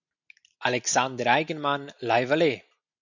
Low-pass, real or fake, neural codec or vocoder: 7.2 kHz; real; none